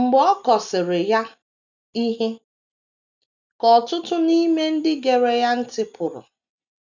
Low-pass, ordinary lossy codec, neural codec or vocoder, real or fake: 7.2 kHz; none; none; real